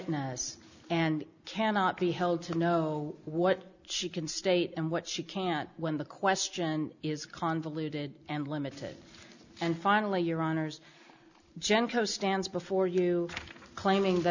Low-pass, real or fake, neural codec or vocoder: 7.2 kHz; real; none